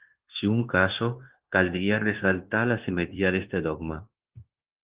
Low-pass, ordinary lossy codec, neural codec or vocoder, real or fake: 3.6 kHz; Opus, 16 kbps; codec, 16 kHz, 0.9 kbps, LongCat-Audio-Codec; fake